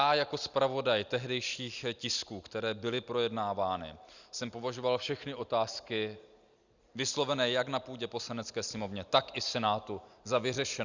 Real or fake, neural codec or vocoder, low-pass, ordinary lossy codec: real; none; 7.2 kHz; Opus, 64 kbps